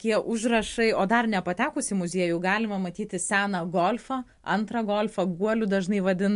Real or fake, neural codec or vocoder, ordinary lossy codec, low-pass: real; none; MP3, 64 kbps; 10.8 kHz